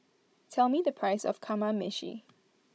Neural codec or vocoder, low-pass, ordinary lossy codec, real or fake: codec, 16 kHz, 16 kbps, FunCodec, trained on Chinese and English, 50 frames a second; none; none; fake